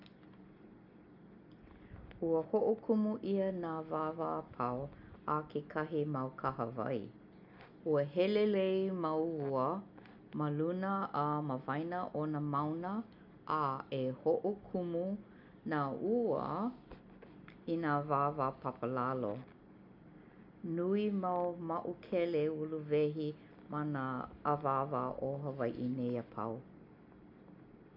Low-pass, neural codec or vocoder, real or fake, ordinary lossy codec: 5.4 kHz; none; real; none